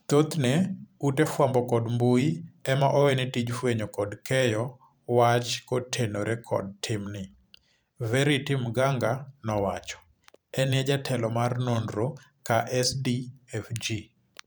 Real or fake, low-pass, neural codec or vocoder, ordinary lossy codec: fake; none; vocoder, 44.1 kHz, 128 mel bands every 512 samples, BigVGAN v2; none